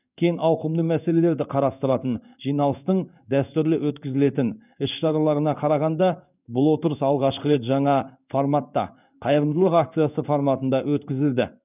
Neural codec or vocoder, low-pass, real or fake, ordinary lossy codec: codec, 16 kHz in and 24 kHz out, 1 kbps, XY-Tokenizer; 3.6 kHz; fake; none